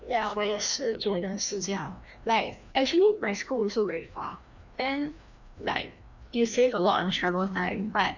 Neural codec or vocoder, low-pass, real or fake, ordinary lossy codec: codec, 16 kHz, 1 kbps, FreqCodec, larger model; 7.2 kHz; fake; none